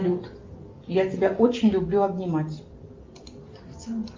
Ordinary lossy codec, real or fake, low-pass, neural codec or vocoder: Opus, 24 kbps; real; 7.2 kHz; none